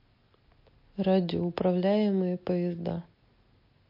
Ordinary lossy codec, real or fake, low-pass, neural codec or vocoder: MP3, 32 kbps; real; 5.4 kHz; none